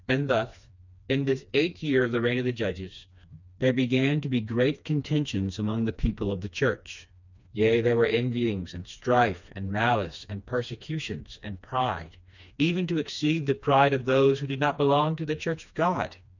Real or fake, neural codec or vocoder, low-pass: fake; codec, 16 kHz, 2 kbps, FreqCodec, smaller model; 7.2 kHz